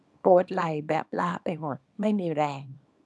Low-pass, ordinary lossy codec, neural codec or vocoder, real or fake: none; none; codec, 24 kHz, 0.9 kbps, WavTokenizer, small release; fake